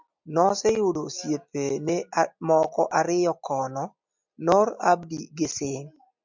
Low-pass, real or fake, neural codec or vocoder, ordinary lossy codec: 7.2 kHz; real; none; MP3, 64 kbps